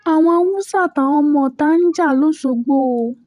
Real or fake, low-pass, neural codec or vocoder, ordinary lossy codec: fake; 14.4 kHz; vocoder, 44.1 kHz, 128 mel bands every 512 samples, BigVGAN v2; none